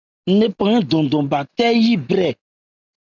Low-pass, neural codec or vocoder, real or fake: 7.2 kHz; none; real